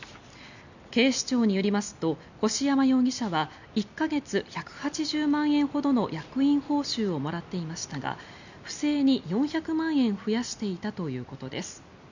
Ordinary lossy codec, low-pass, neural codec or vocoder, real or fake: none; 7.2 kHz; none; real